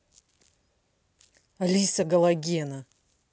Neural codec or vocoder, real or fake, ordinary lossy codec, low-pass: none; real; none; none